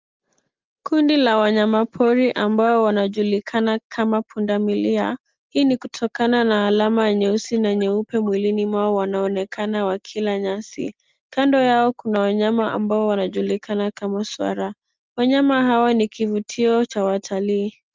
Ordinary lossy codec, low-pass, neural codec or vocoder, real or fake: Opus, 32 kbps; 7.2 kHz; none; real